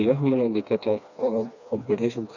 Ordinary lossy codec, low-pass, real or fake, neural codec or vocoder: none; 7.2 kHz; fake; codec, 16 kHz, 2 kbps, FreqCodec, smaller model